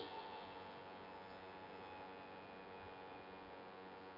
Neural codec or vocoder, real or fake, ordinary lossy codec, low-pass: vocoder, 24 kHz, 100 mel bands, Vocos; fake; none; 5.4 kHz